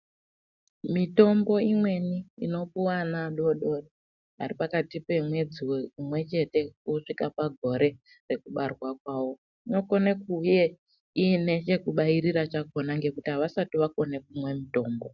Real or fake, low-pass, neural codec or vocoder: real; 7.2 kHz; none